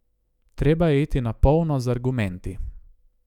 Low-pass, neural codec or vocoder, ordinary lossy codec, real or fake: 19.8 kHz; autoencoder, 48 kHz, 128 numbers a frame, DAC-VAE, trained on Japanese speech; none; fake